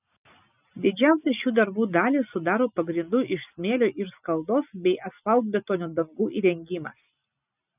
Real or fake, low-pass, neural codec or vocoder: real; 3.6 kHz; none